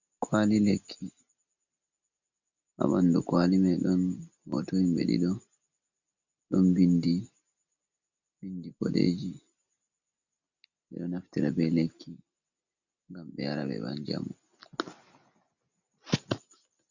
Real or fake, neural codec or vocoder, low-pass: real; none; 7.2 kHz